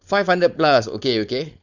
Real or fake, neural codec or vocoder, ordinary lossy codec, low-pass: fake; codec, 16 kHz, 4.8 kbps, FACodec; none; 7.2 kHz